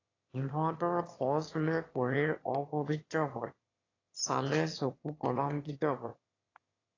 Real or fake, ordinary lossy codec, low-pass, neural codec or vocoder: fake; AAC, 32 kbps; 7.2 kHz; autoencoder, 22.05 kHz, a latent of 192 numbers a frame, VITS, trained on one speaker